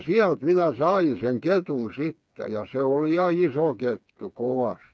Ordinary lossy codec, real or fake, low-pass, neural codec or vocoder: none; fake; none; codec, 16 kHz, 4 kbps, FreqCodec, smaller model